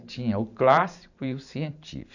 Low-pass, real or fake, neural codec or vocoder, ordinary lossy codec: 7.2 kHz; fake; vocoder, 44.1 kHz, 128 mel bands every 512 samples, BigVGAN v2; none